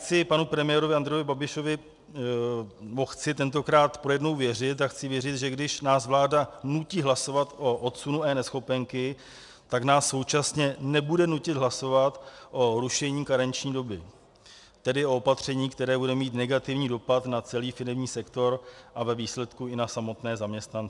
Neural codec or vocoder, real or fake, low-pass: none; real; 10.8 kHz